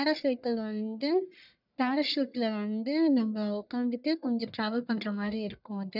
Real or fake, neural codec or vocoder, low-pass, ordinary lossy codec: fake; codec, 44.1 kHz, 1.7 kbps, Pupu-Codec; 5.4 kHz; none